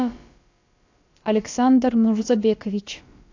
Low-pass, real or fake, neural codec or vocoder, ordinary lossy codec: 7.2 kHz; fake; codec, 16 kHz, about 1 kbps, DyCAST, with the encoder's durations; AAC, 48 kbps